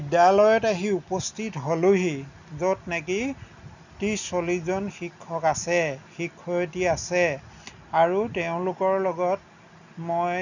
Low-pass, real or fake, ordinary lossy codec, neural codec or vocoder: 7.2 kHz; real; none; none